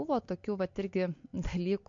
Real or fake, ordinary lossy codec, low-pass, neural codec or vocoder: real; MP3, 48 kbps; 7.2 kHz; none